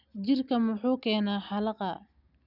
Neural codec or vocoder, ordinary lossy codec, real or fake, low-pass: none; none; real; 5.4 kHz